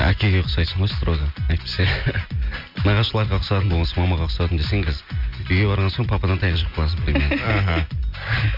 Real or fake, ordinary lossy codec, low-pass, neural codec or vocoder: real; MP3, 48 kbps; 5.4 kHz; none